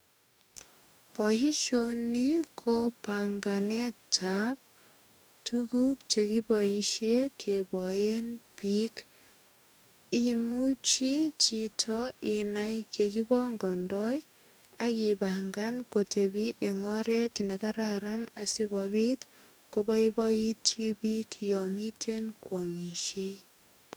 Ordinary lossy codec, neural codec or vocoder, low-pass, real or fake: none; codec, 44.1 kHz, 2.6 kbps, DAC; none; fake